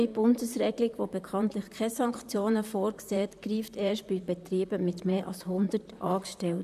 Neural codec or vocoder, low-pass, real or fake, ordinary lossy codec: vocoder, 44.1 kHz, 128 mel bands, Pupu-Vocoder; 14.4 kHz; fake; none